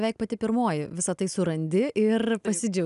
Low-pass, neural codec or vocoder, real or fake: 10.8 kHz; none; real